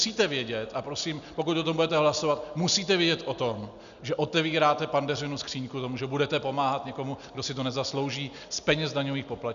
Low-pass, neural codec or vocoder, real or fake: 7.2 kHz; none; real